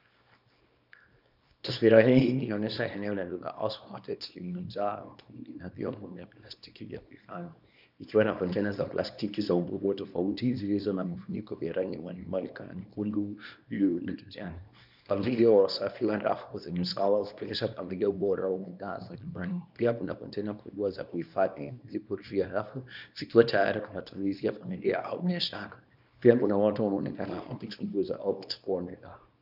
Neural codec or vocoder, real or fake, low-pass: codec, 24 kHz, 0.9 kbps, WavTokenizer, small release; fake; 5.4 kHz